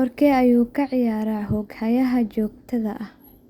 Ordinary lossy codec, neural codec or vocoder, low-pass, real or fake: Opus, 64 kbps; none; 19.8 kHz; real